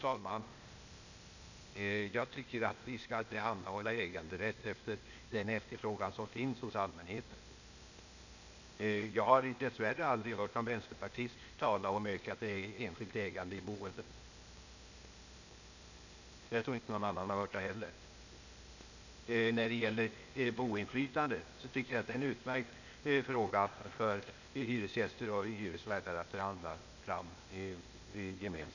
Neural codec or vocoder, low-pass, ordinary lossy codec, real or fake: codec, 16 kHz, 0.8 kbps, ZipCodec; 7.2 kHz; none; fake